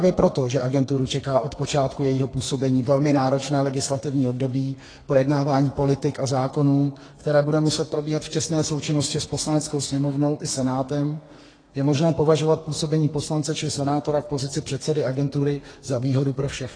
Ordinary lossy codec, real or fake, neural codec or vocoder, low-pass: AAC, 32 kbps; fake; codec, 32 kHz, 1.9 kbps, SNAC; 9.9 kHz